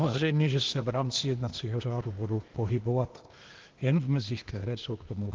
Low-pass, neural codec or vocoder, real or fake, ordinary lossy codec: 7.2 kHz; codec, 16 kHz, 0.8 kbps, ZipCodec; fake; Opus, 16 kbps